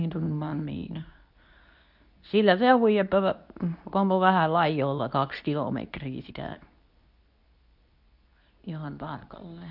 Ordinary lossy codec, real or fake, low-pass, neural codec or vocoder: none; fake; 5.4 kHz; codec, 24 kHz, 0.9 kbps, WavTokenizer, medium speech release version 2